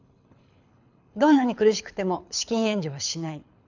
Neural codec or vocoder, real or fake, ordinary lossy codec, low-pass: codec, 24 kHz, 6 kbps, HILCodec; fake; none; 7.2 kHz